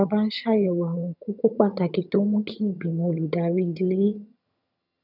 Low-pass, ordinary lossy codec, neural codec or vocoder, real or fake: 5.4 kHz; none; vocoder, 22.05 kHz, 80 mel bands, HiFi-GAN; fake